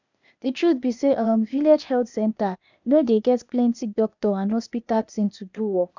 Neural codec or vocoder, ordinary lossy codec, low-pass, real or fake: codec, 16 kHz, 0.8 kbps, ZipCodec; none; 7.2 kHz; fake